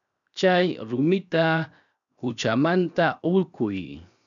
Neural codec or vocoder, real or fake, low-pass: codec, 16 kHz, 0.7 kbps, FocalCodec; fake; 7.2 kHz